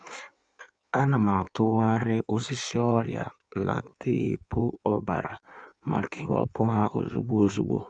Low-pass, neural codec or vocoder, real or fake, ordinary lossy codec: 9.9 kHz; codec, 16 kHz in and 24 kHz out, 1.1 kbps, FireRedTTS-2 codec; fake; none